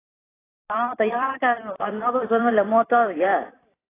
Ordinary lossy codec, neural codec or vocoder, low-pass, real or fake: AAC, 16 kbps; vocoder, 44.1 kHz, 128 mel bands every 256 samples, BigVGAN v2; 3.6 kHz; fake